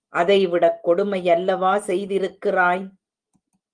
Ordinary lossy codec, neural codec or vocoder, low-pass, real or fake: Opus, 24 kbps; none; 9.9 kHz; real